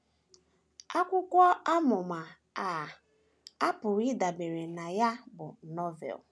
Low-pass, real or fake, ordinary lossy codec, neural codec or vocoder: none; real; none; none